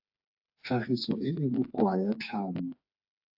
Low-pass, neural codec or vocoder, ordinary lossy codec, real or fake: 5.4 kHz; codec, 16 kHz, 4 kbps, FreqCodec, smaller model; AAC, 48 kbps; fake